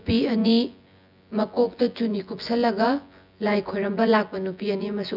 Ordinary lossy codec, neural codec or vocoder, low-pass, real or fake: none; vocoder, 24 kHz, 100 mel bands, Vocos; 5.4 kHz; fake